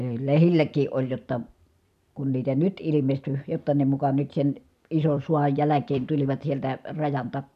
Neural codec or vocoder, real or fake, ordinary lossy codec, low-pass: vocoder, 44.1 kHz, 128 mel bands every 256 samples, BigVGAN v2; fake; none; 14.4 kHz